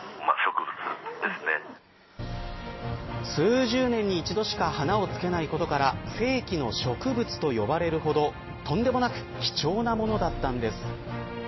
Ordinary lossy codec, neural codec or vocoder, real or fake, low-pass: MP3, 24 kbps; none; real; 7.2 kHz